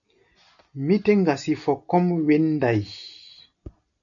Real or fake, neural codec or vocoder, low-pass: real; none; 7.2 kHz